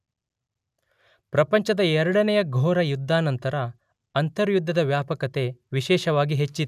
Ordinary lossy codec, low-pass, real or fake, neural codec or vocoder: none; 14.4 kHz; real; none